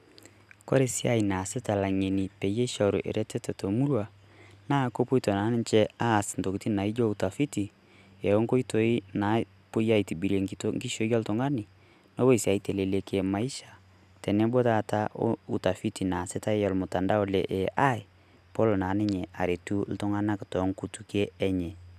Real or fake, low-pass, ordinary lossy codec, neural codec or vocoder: real; 14.4 kHz; none; none